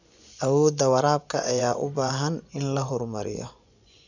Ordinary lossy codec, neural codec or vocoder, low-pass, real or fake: none; vocoder, 24 kHz, 100 mel bands, Vocos; 7.2 kHz; fake